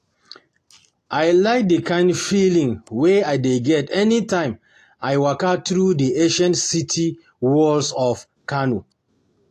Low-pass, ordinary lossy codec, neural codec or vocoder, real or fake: 14.4 kHz; AAC, 48 kbps; none; real